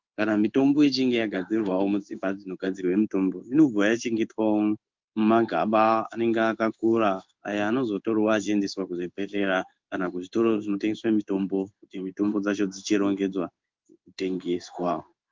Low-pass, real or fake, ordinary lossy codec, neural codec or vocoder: 7.2 kHz; fake; Opus, 24 kbps; codec, 16 kHz in and 24 kHz out, 1 kbps, XY-Tokenizer